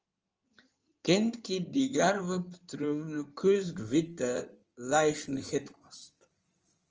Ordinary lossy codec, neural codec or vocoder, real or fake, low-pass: Opus, 24 kbps; codec, 16 kHz in and 24 kHz out, 2.2 kbps, FireRedTTS-2 codec; fake; 7.2 kHz